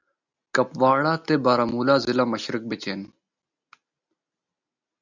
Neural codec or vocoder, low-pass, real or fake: none; 7.2 kHz; real